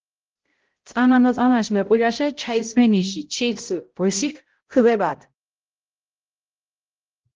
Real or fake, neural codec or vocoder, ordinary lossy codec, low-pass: fake; codec, 16 kHz, 0.5 kbps, X-Codec, HuBERT features, trained on balanced general audio; Opus, 16 kbps; 7.2 kHz